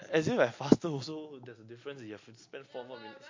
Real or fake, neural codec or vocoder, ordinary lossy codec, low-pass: real; none; MP3, 48 kbps; 7.2 kHz